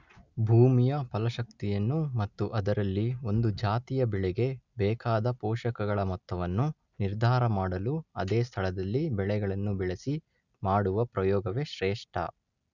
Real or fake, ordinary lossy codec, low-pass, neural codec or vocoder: real; none; 7.2 kHz; none